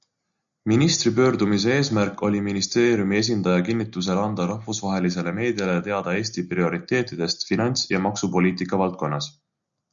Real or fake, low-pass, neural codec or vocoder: real; 7.2 kHz; none